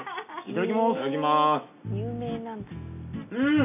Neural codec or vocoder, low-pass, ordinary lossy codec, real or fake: none; 3.6 kHz; none; real